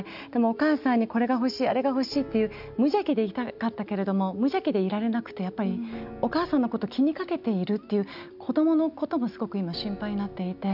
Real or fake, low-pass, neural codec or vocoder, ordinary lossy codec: real; 5.4 kHz; none; none